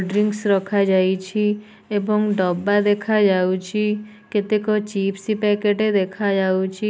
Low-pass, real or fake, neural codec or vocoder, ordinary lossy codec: none; real; none; none